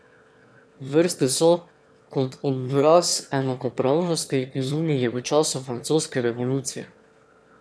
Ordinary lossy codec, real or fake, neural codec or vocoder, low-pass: none; fake; autoencoder, 22.05 kHz, a latent of 192 numbers a frame, VITS, trained on one speaker; none